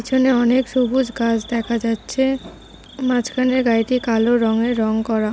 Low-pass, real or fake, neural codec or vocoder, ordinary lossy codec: none; real; none; none